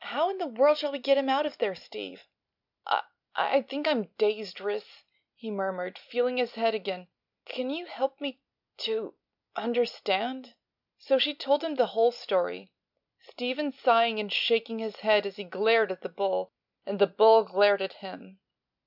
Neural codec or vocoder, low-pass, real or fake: none; 5.4 kHz; real